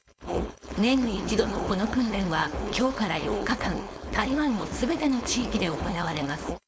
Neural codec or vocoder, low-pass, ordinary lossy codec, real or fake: codec, 16 kHz, 4.8 kbps, FACodec; none; none; fake